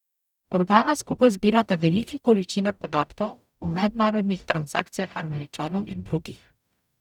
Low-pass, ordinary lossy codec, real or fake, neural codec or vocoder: 19.8 kHz; none; fake; codec, 44.1 kHz, 0.9 kbps, DAC